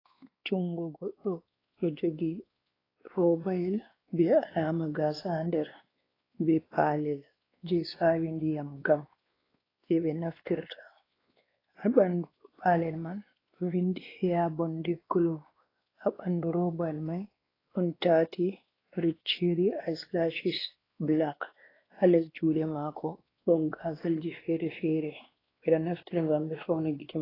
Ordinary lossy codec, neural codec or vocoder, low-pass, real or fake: AAC, 24 kbps; codec, 16 kHz, 2 kbps, X-Codec, WavLM features, trained on Multilingual LibriSpeech; 5.4 kHz; fake